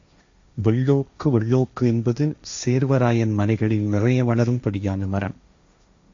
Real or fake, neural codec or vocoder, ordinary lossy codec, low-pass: fake; codec, 16 kHz, 1.1 kbps, Voila-Tokenizer; AAC, 64 kbps; 7.2 kHz